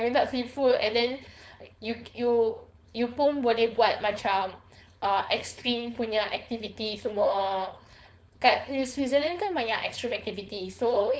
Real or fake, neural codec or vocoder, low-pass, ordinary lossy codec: fake; codec, 16 kHz, 4.8 kbps, FACodec; none; none